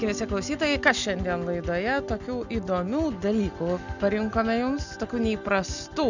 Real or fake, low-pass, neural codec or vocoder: real; 7.2 kHz; none